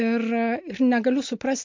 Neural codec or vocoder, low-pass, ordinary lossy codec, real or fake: none; 7.2 kHz; MP3, 48 kbps; real